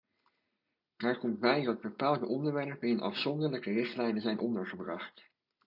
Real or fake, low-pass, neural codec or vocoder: real; 5.4 kHz; none